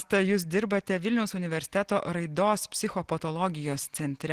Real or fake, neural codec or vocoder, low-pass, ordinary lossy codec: real; none; 14.4 kHz; Opus, 16 kbps